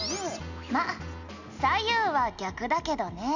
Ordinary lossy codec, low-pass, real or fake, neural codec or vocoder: none; 7.2 kHz; real; none